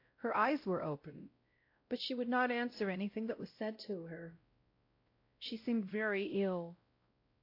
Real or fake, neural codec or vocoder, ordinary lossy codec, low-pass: fake; codec, 16 kHz, 0.5 kbps, X-Codec, WavLM features, trained on Multilingual LibriSpeech; AAC, 32 kbps; 5.4 kHz